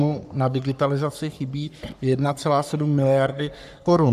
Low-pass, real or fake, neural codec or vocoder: 14.4 kHz; fake; codec, 44.1 kHz, 3.4 kbps, Pupu-Codec